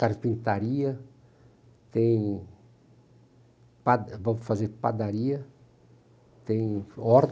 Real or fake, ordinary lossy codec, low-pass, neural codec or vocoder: real; none; none; none